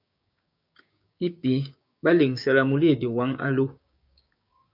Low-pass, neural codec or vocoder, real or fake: 5.4 kHz; codec, 16 kHz, 6 kbps, DAC; fake